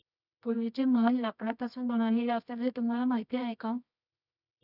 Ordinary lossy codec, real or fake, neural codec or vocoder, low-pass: none; fake; codec, 24 kHz, 0.9 kbps, WavTokenizer, medium music audio release; 5.4 kHz